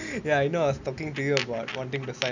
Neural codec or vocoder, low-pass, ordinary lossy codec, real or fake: none; 7.2 kHz; none; real